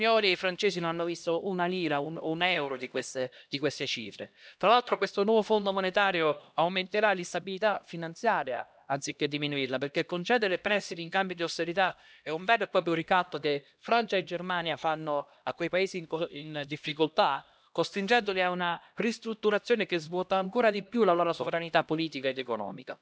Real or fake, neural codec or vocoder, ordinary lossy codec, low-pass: fake; codec, 16 kHz, 1 kbps, X-Codec, HuBERT features, trained on LibriSpeech; none; none